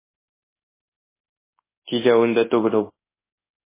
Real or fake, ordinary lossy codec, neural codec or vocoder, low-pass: fake; MP3, 16 kbps; codec, 24 kHz, 0.9 kbps, WavTokenizer, large speech release; 3.6 kHz